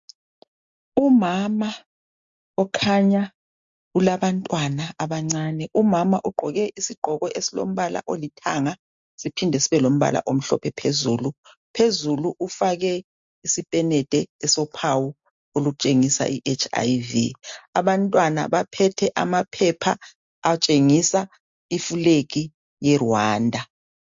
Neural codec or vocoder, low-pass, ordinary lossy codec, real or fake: none; 7.2 kHz; MP3, 48 kbps; real